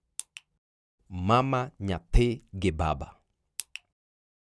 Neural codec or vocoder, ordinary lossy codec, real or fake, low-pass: none; none; real; none